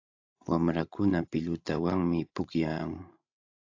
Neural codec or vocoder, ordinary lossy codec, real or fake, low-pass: codec, 16 kHz, 16 kbps, FreqCodec, larger model; AAC, 48 kbps; fake; 7.2 kHz